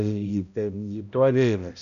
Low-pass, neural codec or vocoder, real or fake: 7.2 kHz; codec, 16 kHz, 0.5 kbps, X-Codec, HuBERT features, trained on balanced general audio; fake